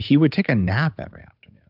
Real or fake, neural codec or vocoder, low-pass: fake; codec, 16 kHz, 8 kbps, FunCodec, trained on Chinese and English, 25 frames a second; 5.4 kHz